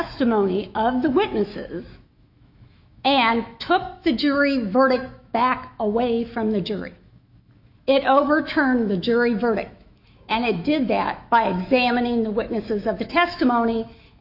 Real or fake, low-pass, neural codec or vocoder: fake; 5.4 kHz; autoencoder, 48 kHz, 128 numbers a frame, DAC-VAE, trained on Japanese speech